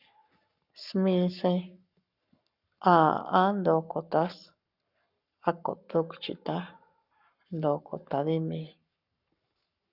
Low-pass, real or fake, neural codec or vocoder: 5.4 kHz; fake; codec, 44.1 kHz, 7.8 kbps, Pupu-Codec